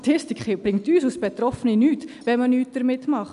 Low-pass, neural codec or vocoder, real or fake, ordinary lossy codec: 10.8 kHz; none; real; none